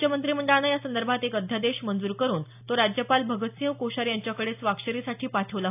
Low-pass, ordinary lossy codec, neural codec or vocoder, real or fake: 3.6 kHz; AAC, 32 kbps; none; real